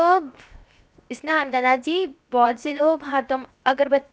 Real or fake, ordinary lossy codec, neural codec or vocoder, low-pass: fake; none; codec, 16 kHz, 0.7 kbps, FocalCodec; none